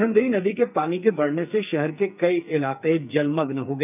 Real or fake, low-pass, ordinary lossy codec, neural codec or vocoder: fake; 3.6 kHz; none; codec, 44.1 kHz, 2.6 kbps, SNAC